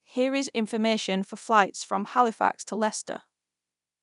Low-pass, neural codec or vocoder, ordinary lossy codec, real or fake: 10.8 kHz; codec, 24 kHz, 0.9 kbps, DualCodec; none; fake